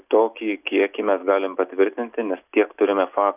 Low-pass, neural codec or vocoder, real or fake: 3.6 kHz; none; real